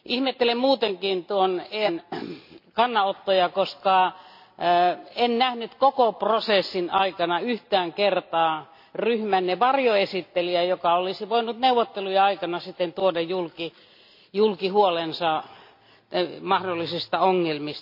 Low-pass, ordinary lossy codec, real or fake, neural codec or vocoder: 5.4 kHz; MP3, 32 kbps; real; none